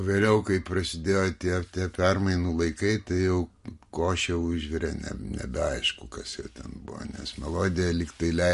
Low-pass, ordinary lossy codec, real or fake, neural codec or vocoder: 14.4 kHz; MP3, 48 kbps; real; none